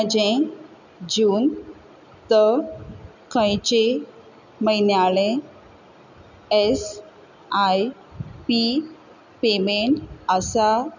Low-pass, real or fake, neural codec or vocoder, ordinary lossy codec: 7.2 kHz; real; none; none